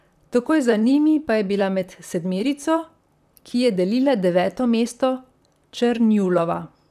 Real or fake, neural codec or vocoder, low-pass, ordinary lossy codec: fake; vocoder, 44.1 kHz, 128 mel bands, Pupu-Vocoder; 14.4 kHz; none